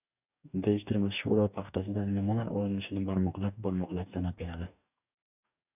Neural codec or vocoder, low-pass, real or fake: codec, 44.1 kHz, 2.6 kbps, DAC; 3.6 kHz; fake